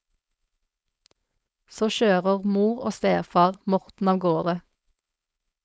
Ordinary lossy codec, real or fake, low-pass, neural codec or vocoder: none; fake; none; codec, 16 kHz, 4.8 kbps, FACodec